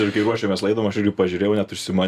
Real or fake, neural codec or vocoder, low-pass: real; none; 14.4 kHz